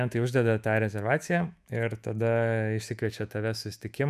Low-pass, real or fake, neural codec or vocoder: 14.4 kHz; fake; autoencoder, 48 kHz, 128 numbers a frame, DAC-VAE, trained on Japanese speech